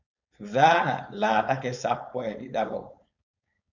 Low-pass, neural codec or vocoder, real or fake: 7.2 kHz; codec, 16 kHz, 4.8 kbps, FACodec; fake